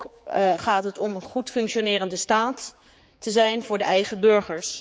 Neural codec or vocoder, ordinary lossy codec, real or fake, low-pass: codec, 16 kHz, 4 kbps, X-Codec, HuBERT features, trained on general audio; none; fake; none